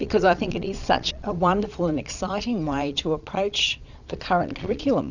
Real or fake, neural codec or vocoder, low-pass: fake; vocoder, 22.05 kHz, 80 mel bands, Vocos; 7.2 kHz